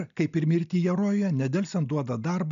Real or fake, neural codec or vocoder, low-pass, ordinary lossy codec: real; none; 7.2 kHz; AAC, 96 kbps